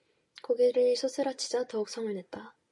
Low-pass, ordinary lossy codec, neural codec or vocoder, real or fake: 10.8 kHz; AAC, 64 kbps; none; real